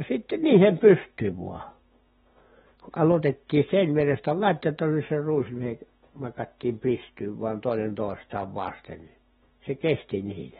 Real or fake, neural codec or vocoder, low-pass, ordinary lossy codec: fake; autoencoder, 48 kHz, 128 numbers a frame, DAC-VAE, trained on Japanese speech; 19.8 kHz; AAC, 16 kbps